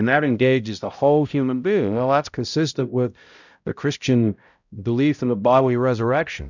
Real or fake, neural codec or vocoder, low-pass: fake; codec, 16 kHz, 0.5 kbps, X-Codec, HuBERT features, trained on balanced general audio; 7.2 kHz